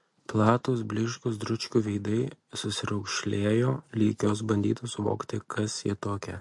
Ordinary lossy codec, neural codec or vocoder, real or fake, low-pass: MP3, 48 kbps; none; real; 10.8 kHz